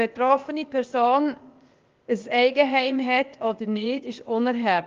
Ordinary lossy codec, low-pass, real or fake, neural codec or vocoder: Opus, 32 kbps; 7.2 kHz; fake; codec, 16 kHz, 0.8 kbps, ZipCodec